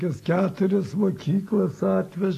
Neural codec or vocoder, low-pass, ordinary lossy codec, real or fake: none; 14.4 kHz; AAC, 48 kbps; real